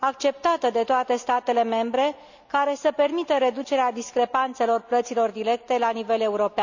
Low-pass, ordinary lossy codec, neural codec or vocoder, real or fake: 7.2 kHz; none; none; real